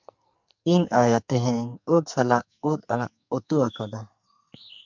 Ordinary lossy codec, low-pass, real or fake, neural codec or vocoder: MP3, 64 kbps; 7.2 kHz; fake; codec, 44.1 kHz, 2.6 kbps, SNAC